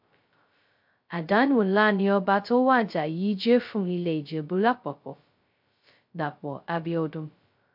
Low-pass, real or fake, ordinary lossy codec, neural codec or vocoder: 5.4 kHz; fake; MP3, 48 kbps; codec, 16 kHz, 0.2 kbps, FocalCodec